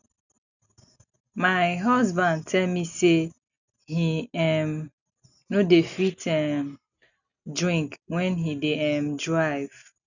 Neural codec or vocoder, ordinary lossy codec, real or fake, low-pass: none; none; real; 7.2 kHz